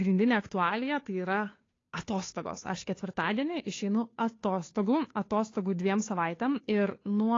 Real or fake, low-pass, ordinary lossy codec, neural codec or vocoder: fake; 7.2 kHz; AAC, 32 kbps; codec, 16 kHz, 2 kbps, FunCodec, trained on Chinese and English, 25 frames a second